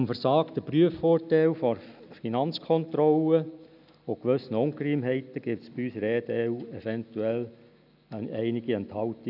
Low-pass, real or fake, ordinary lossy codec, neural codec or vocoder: 5.4 kHz; real; none; none